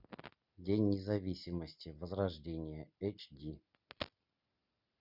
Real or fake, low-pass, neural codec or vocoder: real; 5.4 kHz; none